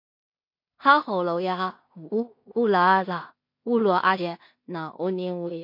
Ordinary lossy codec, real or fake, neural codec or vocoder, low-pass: MP3, 32 kbps; fake; codec, 16 kHz in and 24 kHz out, 0.4 kbps, LongCat-Audio-Codec, two codebook decoder; 5.4 kHz